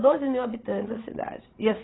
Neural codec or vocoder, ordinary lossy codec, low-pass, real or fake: codec, 16 kHz, 16 kbps, FreqCodec, larger model; AAC, 16 kbps; 7.2 kHz; fake